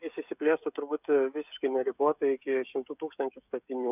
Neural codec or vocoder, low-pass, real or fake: codec, 16 kHz, 16 kbps, FreqCodec, smaller model; 3.6 kHz; fake